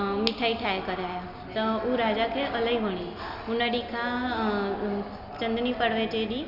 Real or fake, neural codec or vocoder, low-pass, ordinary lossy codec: real; none; 5.4 kHz; none